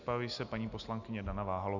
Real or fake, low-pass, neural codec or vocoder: real; 7.2 kHz; none